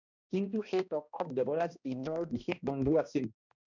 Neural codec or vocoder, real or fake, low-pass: codec, 16 kHz, 1 kbps, X-Codec, HuBERT features, trained on balanced general audio; fake; 7.2 kHz